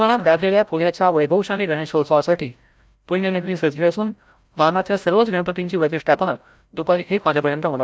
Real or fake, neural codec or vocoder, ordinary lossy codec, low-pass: fake; codec, 16 kHz, 0.5 kbps, FreqCodec, larger model; none; none